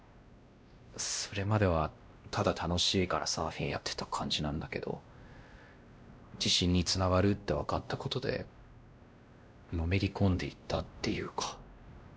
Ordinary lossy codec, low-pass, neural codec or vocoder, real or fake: none; none; codec, 16 kHz, 1 kbps, X-Codec, WavLM features, trained on Multilingual LibriSpeech; fake